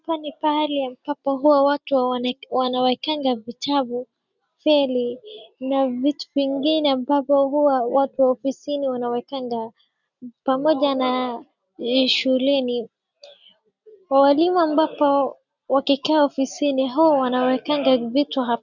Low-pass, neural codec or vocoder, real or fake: 7.2 kHz; none; real